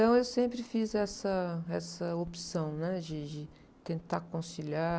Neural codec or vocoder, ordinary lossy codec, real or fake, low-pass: none; none; real; none